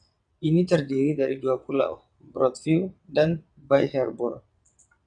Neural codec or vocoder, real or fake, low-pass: vocoder, 22.05 kHz, 80 mel bands, WaveNeXt; fake; 9.9 kHz